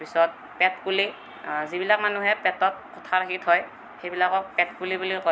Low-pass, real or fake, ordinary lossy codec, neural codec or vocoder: none; real; none; none